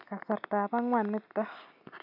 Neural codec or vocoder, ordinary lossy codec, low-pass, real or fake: none; none; 5.4 kHz; real